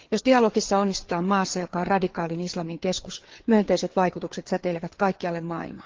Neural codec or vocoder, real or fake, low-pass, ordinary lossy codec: codec, 16 kHz, 4 kbps, FreqCodec, larger model; fake; 7.2 kHz; Opus, 16 kbps